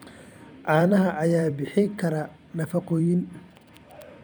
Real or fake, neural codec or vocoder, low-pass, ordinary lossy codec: fake; vocoder, 44.1 kHz, 128 mel bands every 256 samples, BigVGAN v2; none; none